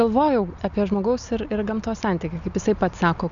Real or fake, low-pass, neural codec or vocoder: real; 7.2 kHz; none